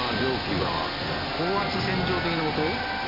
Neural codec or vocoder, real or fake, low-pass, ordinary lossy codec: none; real; 5.4 kHz; MP3, 32 kbps